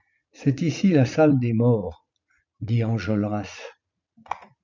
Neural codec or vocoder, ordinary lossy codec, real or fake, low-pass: vocoder, 44.1 kHz, 80 mel bands, Vocos; MP3, 64 kbps; fake; 7.2 kHz